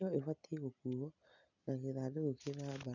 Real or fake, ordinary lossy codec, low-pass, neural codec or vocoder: real; none; 7.2 kHz; none